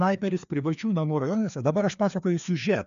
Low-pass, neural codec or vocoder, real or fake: 7.2 kHz; codec, 16 kHz, 2 kbps, FreqCodec, larger model; fake